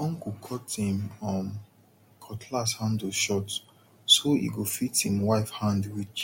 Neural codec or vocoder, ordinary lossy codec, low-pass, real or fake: none; MP3, 64 kbps; 19.8 kHz; real